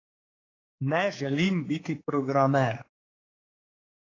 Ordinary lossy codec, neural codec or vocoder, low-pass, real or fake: AAC, 32 kbps; codec, 16 kHz, 4 kbps, X-Codec, HuBERT features, trained on general audio; 7.2 kHz; fake